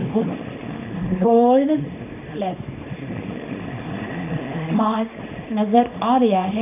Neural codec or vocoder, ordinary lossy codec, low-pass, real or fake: codec, 24 kHz, 0.9 kbps, WavTokenizer, small release; none; 3.6 kHz; fake